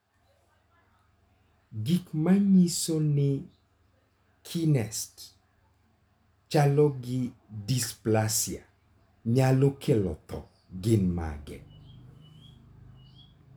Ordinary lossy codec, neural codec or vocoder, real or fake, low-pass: none; none; real; none